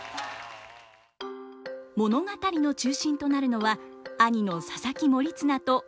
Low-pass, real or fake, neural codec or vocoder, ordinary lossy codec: none; real; none; none